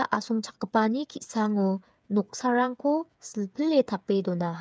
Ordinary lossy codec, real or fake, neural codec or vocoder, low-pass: none; fake; codec, 16 kHz, 8 kbps, FreqCodec, smaller model; none